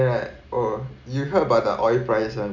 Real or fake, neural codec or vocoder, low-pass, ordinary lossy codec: real; none; 7.2 kHz; none